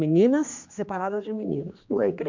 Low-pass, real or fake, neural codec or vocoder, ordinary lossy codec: 7.2 kHz; fake; codec, 16 kHz, 2 kbps, X-Codec, HuBERT features, trained on general audio; MP3, 64 kbps